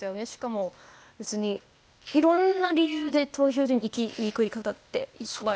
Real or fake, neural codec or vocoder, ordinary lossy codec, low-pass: fake; codec, 16 kHz, 0.8 kbps, ZipCodec; none; none